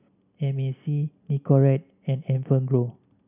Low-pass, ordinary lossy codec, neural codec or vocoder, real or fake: 3.6 kHz; none; none; real